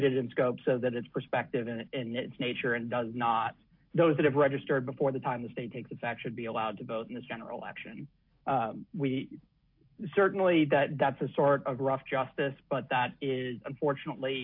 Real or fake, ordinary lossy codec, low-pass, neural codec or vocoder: real; AAC, 48 kbps; 5.4 kHz; none